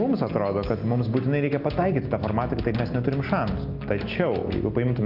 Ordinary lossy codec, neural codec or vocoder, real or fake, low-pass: Opus, 24 kbps; none; real; 5.4 kHz